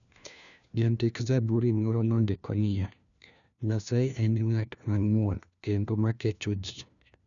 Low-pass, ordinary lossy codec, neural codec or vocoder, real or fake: 7.2 kHz; none; codec, 16 kHz, 1 kbps, FunCodec, trained on LibriTTS, 50 frames a second; fake